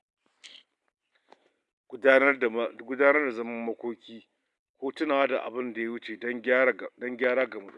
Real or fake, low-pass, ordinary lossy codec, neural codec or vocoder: real; 10.8 kHz; none; none